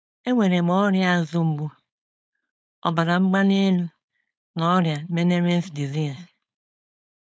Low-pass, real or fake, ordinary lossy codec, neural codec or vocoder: none; fake; none; codec, 16 kHz, 4.8 kbps, FACodec